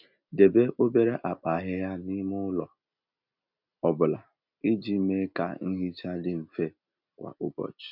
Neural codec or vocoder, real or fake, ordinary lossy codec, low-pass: none; real; none; 5.4 kHz